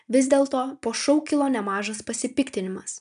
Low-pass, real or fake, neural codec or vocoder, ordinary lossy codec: 9.9 kHz; real; none; MP3, 96 kbps